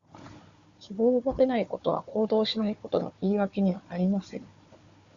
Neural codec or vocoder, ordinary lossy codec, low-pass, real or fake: codec, 16 kHz, 4 kbps, FunCodec, trained on Chinese and English, 50 frames a second; Opus, 64 kbps; 7.2 kHz; fake